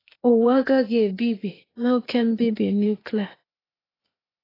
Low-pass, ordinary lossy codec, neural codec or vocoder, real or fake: 5.4 kHz; AAC, 24 kbps; codec, 16 kHz, 0.8 kbps, ZipCodec; fake